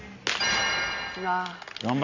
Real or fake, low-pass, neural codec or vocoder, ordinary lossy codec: real; 7.2 kHz; none; none